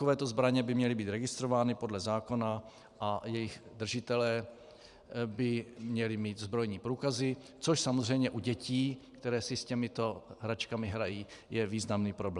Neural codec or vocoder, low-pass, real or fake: vocoder, 44.1 kHz, 128 mel bands every 512 samples, BigVGAN v2; 10.8 kHz; fake